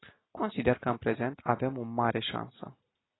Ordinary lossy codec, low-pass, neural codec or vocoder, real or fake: AAC, 16 kbps; 7.2 kHz; none; real